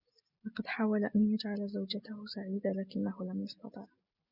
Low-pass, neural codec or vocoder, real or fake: 5.4 kHz; none; real